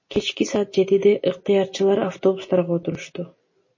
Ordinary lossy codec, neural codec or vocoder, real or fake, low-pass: MP3, 32 kbps; none; real; 7.2 kHz